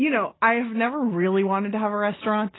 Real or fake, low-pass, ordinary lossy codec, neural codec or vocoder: real; 7.2 kHz; AAC, 16 kbps; none